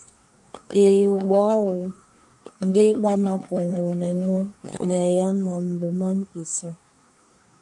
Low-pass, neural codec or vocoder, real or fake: 10.8 kHz; codec, 24 kHz, 1 kbps, SNAC; fake